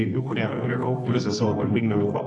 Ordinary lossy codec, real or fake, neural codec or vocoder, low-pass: AAC, 48 kbps; fake; codec, 24 kHz, 0.9 kbps, WavTokenizer, medium music audio release; 10.8 kHz